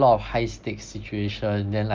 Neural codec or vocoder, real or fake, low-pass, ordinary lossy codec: none; real; 7.2 kHz; Opus, 32 kbps